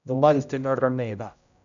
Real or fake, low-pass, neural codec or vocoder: fake; 7.2 kHz; codec, 16 kHz, 0.5 kbps, X-Codec, HuBERT features, trained on general audio